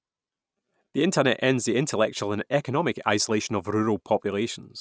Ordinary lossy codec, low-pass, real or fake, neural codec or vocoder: none; none; real; none